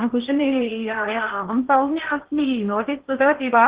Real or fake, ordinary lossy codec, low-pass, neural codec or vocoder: fake; Opus, 16 kbps; 3.6 kHz; codec, 16 kHz in and 24 kHz out, 0.8 kbps, FocalCodec, streaming, 65536 codes